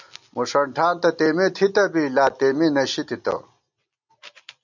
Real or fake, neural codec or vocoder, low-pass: real; none; 7.2 kHz